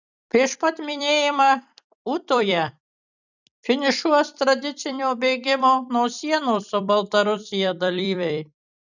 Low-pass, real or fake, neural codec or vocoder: 7.2 kHz; fake; vocoder, 44.1 kHz, 128 mel bands every 256 samples, BigVGAN v2